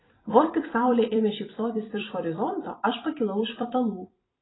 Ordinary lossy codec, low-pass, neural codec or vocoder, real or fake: AAC, 16 kbps; 7.2 kHz; vocoder, 44.1 kHz, 128 mel bands every 256 samples, BigVGAN v2; fake